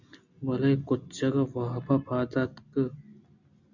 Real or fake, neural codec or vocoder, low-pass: real; none; 7.2 kHz